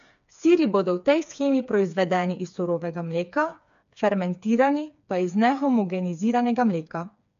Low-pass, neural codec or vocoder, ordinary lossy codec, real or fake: 7.2 kHz; codec, 16 kHz, 4 kbps, FreqCodec, smaller model; MP3, 64 kbps; fake